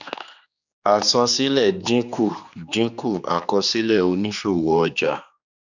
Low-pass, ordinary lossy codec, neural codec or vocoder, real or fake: 7.2 kHz; none; codec, 16 kHz, 2 kbps, X-Codec, HuBERT features, trained on general audio; fake